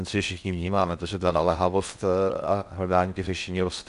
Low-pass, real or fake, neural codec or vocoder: 10.8 kHz; fake; codec, 16 kHz in and 24 kHz out, 0.6 kbps, FocalCodec, streaming, 4096 codes